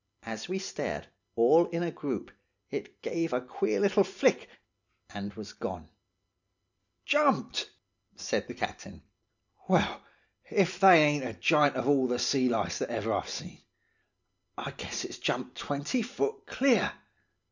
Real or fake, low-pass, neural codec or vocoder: real; 7.2 kHz; none